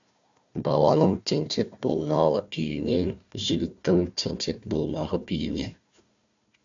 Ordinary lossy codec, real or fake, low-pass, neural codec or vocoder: AAC, 64 kbps; fake; 7.2 kHz; codec, 16 kHz, 1 kbps, FunCodec, trained on Chinese and English, 50 frames a second